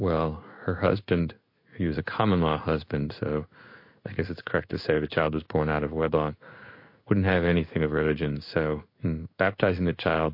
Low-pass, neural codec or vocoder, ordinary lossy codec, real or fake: 5.4 kHz; codec, 16 kHz in and 24 kHz out, 1 kbps, XY-Tokenizer; MP3, 32 kbps; fake